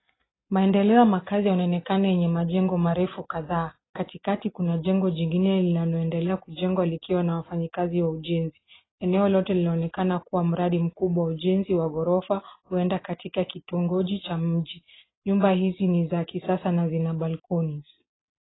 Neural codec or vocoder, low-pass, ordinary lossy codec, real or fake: none; 7.2 kHz; AAC, 16 kbps; real